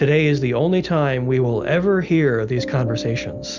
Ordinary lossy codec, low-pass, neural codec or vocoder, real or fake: Opus, 64 kbps; 7.2 kHz; none; real